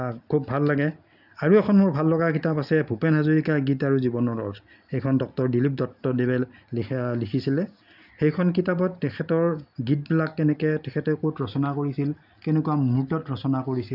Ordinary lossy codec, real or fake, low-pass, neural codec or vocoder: none; real; 5.4 kHz; none